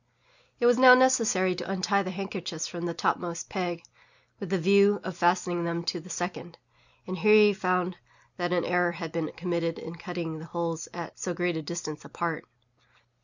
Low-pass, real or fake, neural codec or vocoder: 7.2 kHz; real; none